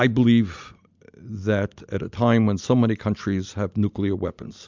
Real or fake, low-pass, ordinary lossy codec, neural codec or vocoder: real; 7.2 kHz; MP3, 64 kbps; none